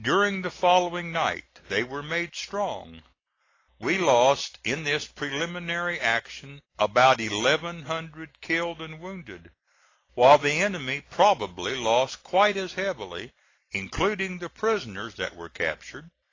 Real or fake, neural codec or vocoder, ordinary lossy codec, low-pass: real; none; AAC, 32 kbps; 7.2 kHz